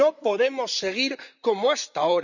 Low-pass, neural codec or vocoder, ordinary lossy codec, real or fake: 7.2 kHz; vocoder, 44.1 kHz, 128 mel bands, Pupu-Vocoder; none; fake